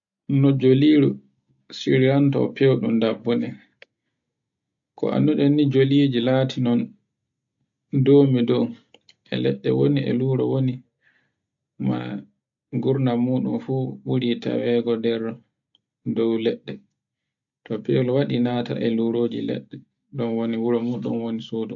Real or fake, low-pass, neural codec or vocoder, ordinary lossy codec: real; 7.2 kHz; none; AAC, 64 kbps